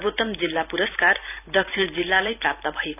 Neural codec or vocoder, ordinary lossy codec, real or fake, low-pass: vocoder, 44.1 kHz, 128 mel bands every 256 samples, BigVGAN v2; none; fake; 3.6 kHz